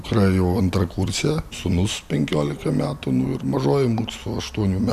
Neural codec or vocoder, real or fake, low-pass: none; real; 14.4 kHz